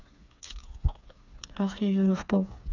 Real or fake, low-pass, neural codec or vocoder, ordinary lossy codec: fake; 7.2 kHz; codec, 16 kHz, 4 kbps, FreqCodec, smaller model; none